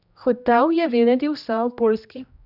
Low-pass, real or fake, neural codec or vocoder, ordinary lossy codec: 5.4 kHz; fake; codec, 16 kHz, 1 kbps, X-Codec, HuBERT features, trained on general audio; none